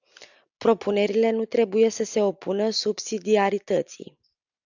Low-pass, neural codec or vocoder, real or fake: 7.2 kHz; none; real